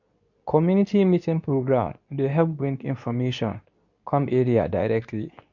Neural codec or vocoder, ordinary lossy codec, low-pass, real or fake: codec, 24 kHz, 0.9 kbps, WavTokenizer, medium speech release version 2; none; 7.2 kHz; fake